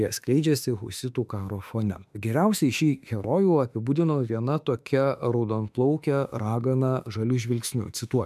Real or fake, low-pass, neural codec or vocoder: fake; 14.4 kHz; autoencoder, 48 kHz, 32 numbers a frame, DAC-VAE, trained on Japanese speech